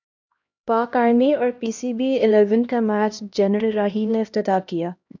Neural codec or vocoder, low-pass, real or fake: codec, 16 kHz, 1 kbps, X-Codec, HuBERT features, trained on LibriSpeech; 7.2 kHz; fake